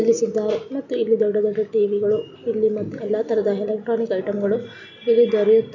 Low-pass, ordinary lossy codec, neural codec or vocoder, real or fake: 7.2 kHz; none; none; real